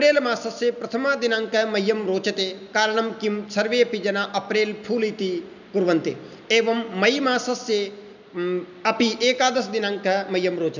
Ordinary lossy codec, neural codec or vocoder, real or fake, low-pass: none; none; real; 7.2 kHz